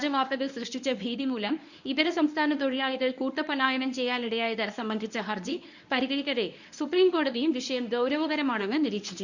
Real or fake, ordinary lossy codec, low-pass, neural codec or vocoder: fake; none; 7.2 kHz; codec, 24 kHz, 0.9 kbps, WavTokenizer, medium speech release version 1